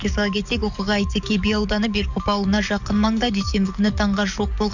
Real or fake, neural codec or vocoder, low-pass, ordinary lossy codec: fake; codec, 44.1 kHz, 7.8 kbps, DAC; 7.2 kHz; none